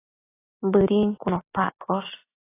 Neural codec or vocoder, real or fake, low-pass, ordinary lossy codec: none; real; 3.6 kHz; AAC, 16 kbps